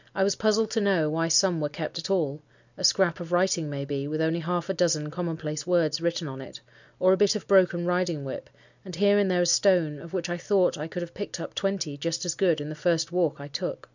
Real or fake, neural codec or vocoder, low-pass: real; none; 7.2 kHz